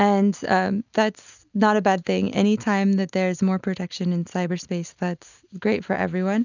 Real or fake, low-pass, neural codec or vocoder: real; 7.2 kHz; none